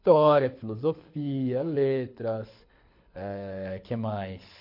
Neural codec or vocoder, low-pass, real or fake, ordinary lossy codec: codec, 24 kHz, 6 kbps, HILCodec; 5.4 kHz; fake; MP3, 32 kbps